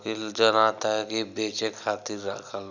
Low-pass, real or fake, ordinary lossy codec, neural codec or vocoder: 7.2 kHz; real; none; none